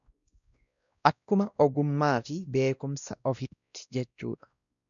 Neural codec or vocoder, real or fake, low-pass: codec, 16 kHz, 1 kbps, X-Codec, WavLM features, trained on Multilingual LibriSpeech; fake; 7.2 kHz